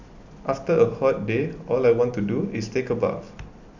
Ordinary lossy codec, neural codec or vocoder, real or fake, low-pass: none; none; real; 7.2 kHz